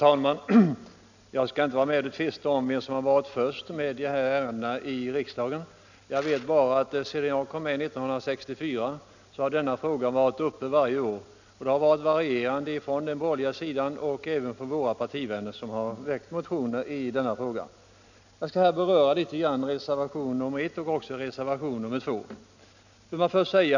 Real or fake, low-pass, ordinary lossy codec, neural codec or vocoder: real; 7.2 kHz; none; none